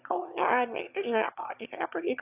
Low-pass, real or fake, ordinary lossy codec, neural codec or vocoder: 3.6 kHz; fake; AAC, 32 kbps; autoencoder, 22.05 kHz, a latent of 192 numbers a frame, VITS, trained on one speaker